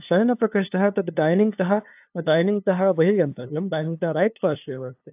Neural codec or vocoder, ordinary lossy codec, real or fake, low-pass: codec, 16 kHz, 2 kbps, FunCodec, trained on LibriTTS, 25 frames a second; AAC, 32 kbps; fake; 3.6 kHz